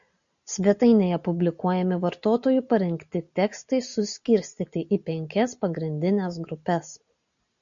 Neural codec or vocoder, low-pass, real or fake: none; 7.2 kHz; real